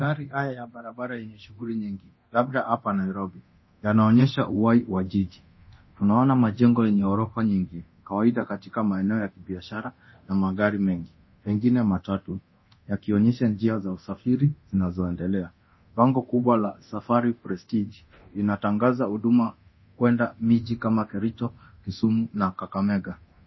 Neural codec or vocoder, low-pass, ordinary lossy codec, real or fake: codec, 24 kHz, 0.9 kbps, DualCodec; 7.2 kHz; MP3, 24 kbps; fake